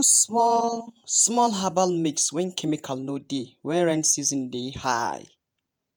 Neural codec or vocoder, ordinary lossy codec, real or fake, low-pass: vocoder, 48 kHz, 128 mel bands, Vocos; none; fake; none